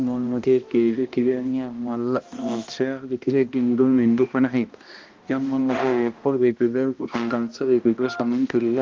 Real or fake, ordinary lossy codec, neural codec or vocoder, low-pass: fake; Opus, 32 kbps; codec, 16 kHz, 1 kbps, X-Codec, HuBERT features, trained on balanced general audio; 7.2 kHz